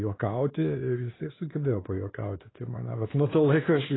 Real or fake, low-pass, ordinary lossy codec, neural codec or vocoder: fake; 7.2 kHz; AAC, 16 kbps; codec, 16 kHz, 2 kbps, X-Codec, WavLM features, trained on Multilingual LibriSpeech